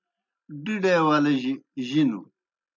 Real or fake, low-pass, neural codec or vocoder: real; 7.2 kHz; none